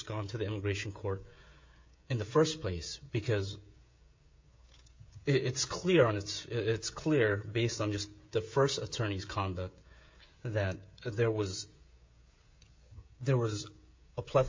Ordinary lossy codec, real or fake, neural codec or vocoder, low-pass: MP3, 48 kbps; fake; codec, 16 kHz, 16 kbps, FreqCodec, smaller model; 7.2 kHz